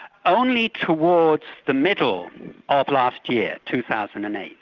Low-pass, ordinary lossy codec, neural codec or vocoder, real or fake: 7.2 kHz; Opus, 24 kbps; none; real